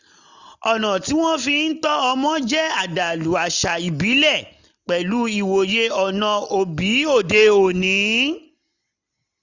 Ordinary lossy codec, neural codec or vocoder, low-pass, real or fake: none; none; 7.2 kHz; real